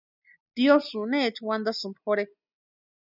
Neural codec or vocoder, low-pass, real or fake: none; 5.4 kHz; real